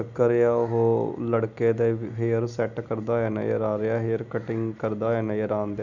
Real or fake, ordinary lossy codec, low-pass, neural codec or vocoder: real; none; 7.2 kHz; none